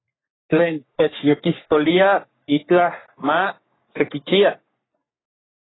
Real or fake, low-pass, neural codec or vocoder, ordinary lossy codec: fake; 7.2 kHz; codec, 44.1 kHz, 3.4 kbps, Pupu-Codec; AAC, 16 kbps